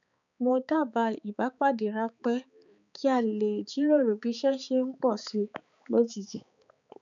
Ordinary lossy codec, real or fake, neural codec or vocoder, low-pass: none; fake; codec, 16 kHz, 4 kbps, X-Codec, HuBERT features, trained on balanced general audio; 7.2 kHz